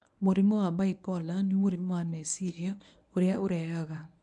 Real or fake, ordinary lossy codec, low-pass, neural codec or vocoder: fake; none; 10.8 kHz; codec, 24 kHz, 0.9 kbps, WavTokenizer, medium speech release version 1